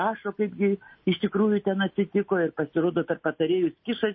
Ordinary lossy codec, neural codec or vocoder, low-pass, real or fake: MP3, 24 kbps; none; 7.2 kHz; real